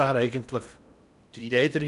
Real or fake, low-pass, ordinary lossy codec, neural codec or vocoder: fake; 10.8 kHz; none; codec, 16 kHz in and 24 kHz out, 0.8 kbps, FocalCodec, streaming, 65536 codes